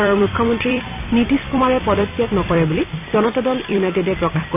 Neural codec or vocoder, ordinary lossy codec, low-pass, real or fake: vocoder, 44.1 kHz, 128 mel bands every 256 samples, BigVGAN v2; none; 3.6 kHz; fake